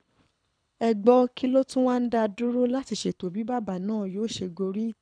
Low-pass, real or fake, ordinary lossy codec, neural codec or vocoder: 9.9 kHz; fake; none; codec, 24 kHz, 6 kbps, HILCodec